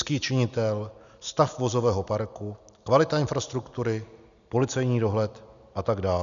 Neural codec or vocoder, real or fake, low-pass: none; real; 7.2 kHz